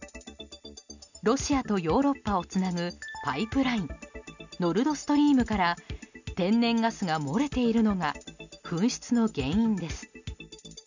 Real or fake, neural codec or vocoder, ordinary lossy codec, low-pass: real; none; none; 7.2 kHz